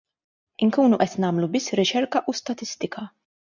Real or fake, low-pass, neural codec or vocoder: real; 7.2 kHz; none